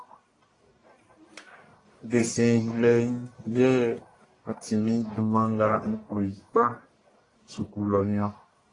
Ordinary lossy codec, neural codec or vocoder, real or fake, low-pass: AAC, 32 kbps; codec, 44.1 kHz, 1.7 kbps, Pupu-Codec; fake; 10.8 kHz